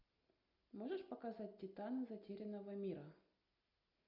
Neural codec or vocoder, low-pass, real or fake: none; 5.4 kHz; real